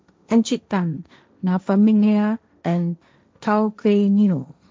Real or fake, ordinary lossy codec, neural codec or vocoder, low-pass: fake; none; codec, 16 kHz, 1.1 kbps, Voila-Tokenizer; none